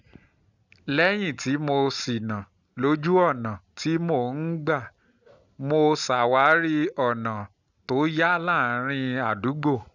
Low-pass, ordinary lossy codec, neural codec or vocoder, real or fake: 7.2 kHz; none; none; real